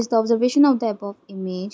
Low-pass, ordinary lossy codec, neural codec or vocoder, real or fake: none; none; none; real